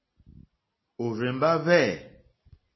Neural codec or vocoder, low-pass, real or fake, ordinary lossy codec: none; 7.2 kHz; real; MP3, 24 kbps